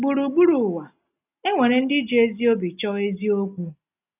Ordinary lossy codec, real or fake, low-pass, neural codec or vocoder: none; real; 3.6 kHz; none